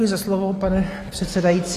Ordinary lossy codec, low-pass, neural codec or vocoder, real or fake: AAC, 48 kbps; 14.4 kHz; none; real